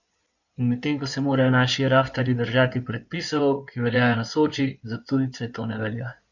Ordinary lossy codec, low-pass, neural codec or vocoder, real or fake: none; 7.2 kHz; codec, 16 kHz in and 24 kHz out, 2.2 kbps, FireRedTTS-2 codec; fake